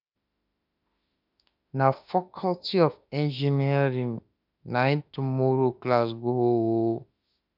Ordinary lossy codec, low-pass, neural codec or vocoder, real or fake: none; 5.4 kHz; autoencoder, 48 kHz, 32 numbers a frame, DAC-VAE, trained on Japanese speech; fake